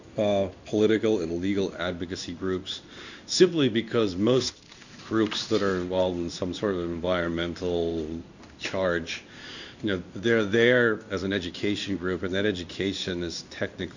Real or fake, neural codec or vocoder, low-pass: fake; codec, 16 kHz in and 24 kHz out, 1 kbps, XY-Tokenizer; 7.2 kHz